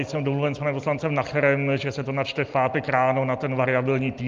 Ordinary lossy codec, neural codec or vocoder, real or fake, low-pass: Opus, 32 kbps; none; real; 7.2 kHz